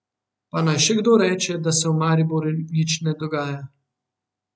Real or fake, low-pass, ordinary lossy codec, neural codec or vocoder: real; none; none; none